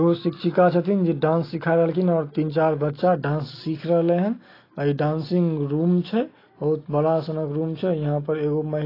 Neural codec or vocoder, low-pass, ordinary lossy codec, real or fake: none; 5.4 kHz; AAC, 24 kbps; real